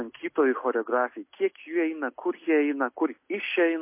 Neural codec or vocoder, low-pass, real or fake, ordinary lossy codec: none; 3.6 kHz; real; MP3, 24 kbps